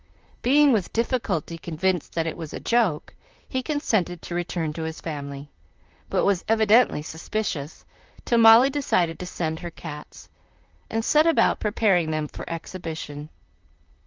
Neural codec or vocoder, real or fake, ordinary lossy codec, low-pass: vocoder, 44.1 kHz, 128 mel bands, Pupu-Vocoder; fake; Opus, 24 kbps; 7.2 kHz